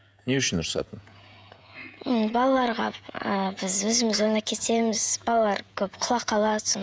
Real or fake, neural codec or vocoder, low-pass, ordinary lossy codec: fake; codec, 16 kHz, 16 kbps, FreqCodec, smaller model; none; none